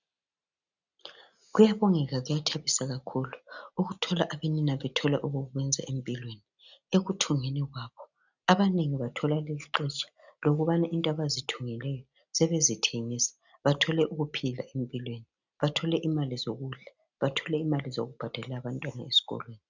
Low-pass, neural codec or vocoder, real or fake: 7.2 kHz; none; real